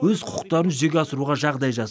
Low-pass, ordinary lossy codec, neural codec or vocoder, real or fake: none; none; none; real